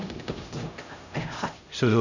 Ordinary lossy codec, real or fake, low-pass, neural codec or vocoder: none; fake; 7.2 kHz; codec, 16 kHz, 0.5 kbps, X-Codec, HuBERT features, trained on LibriSpeech